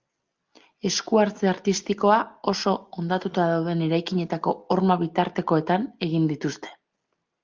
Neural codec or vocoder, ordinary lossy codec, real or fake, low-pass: none; Opus, 24 kbps; real; 7.2 kHz